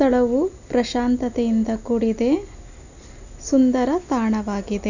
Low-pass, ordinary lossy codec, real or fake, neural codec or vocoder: 7.2 kHz; none; real; none